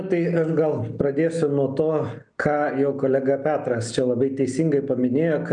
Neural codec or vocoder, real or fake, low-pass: none; real; 9.9 kHz